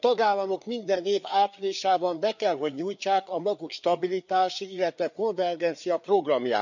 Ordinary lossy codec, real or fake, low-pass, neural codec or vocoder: none; fake; 7.2 kHz; codec, 16 kHz, 4 kbps, FreqCodec, larger model